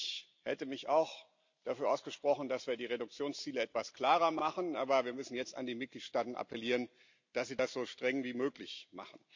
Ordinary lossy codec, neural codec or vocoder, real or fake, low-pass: MP3, 64 kbps; none; real; 7.2 kHz